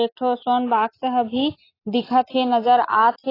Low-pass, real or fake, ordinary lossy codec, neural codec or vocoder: 5.4 kHz; real; AAC, 24 kbps; none